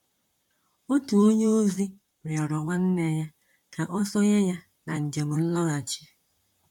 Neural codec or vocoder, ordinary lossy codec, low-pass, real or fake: vocoder, 44.1 kHz, 128 mel bands, Pupu-Vocoder; MP3, 96 kbps; 19.8 kHz; fake